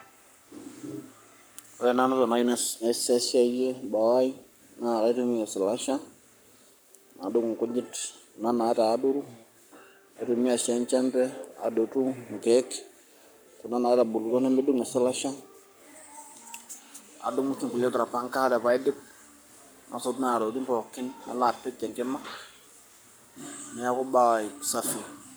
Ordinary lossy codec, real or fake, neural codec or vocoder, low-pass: none; fake; codec, 44.1 kHz, 7.8 kbps, Pupu-Codec; none